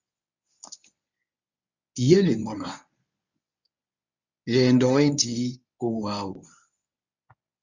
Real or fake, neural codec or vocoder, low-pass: fake; codec, 24 kHz, 0.9 kbps, WavTokenizer, medium speech release version 1; 7.2 kHz